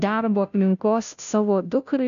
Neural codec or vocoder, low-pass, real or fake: codec, 16 kHz, 0.5 kbps, FunCodec, trained on Chinese and English, 25 frames a second; 7.2 kHz; fake